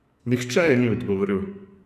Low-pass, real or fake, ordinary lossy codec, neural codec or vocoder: 14.4 kHz; fake; none; codec, 44.1 kHz, 2.6 kbps, SNAC